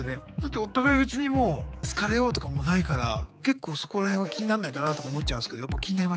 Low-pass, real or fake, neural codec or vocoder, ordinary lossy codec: none; fake; codec, 16 kHz, 4 kbps, X-Codec, HuBERT features, trained on general audio; none